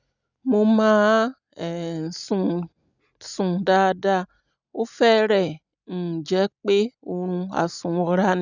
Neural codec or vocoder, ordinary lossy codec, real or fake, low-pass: none; none; real; 7.2 kHz